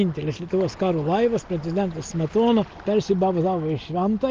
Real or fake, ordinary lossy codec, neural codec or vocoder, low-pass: real; Opus, 32 kbps; none; 7.2 kHz